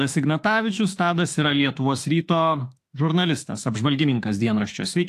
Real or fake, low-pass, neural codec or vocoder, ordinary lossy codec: fake; 14.4 kHz; autoencoder, 48 kHz, 32 numbers a frame, DAC-VAE, trained on Japanese speech; AAC, 64 kbps